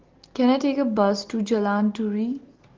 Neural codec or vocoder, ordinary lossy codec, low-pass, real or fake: none; Opus, 16 kbps; 7.2 kHz; real